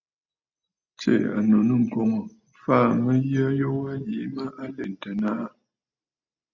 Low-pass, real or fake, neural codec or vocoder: 7.2 kHz; real; none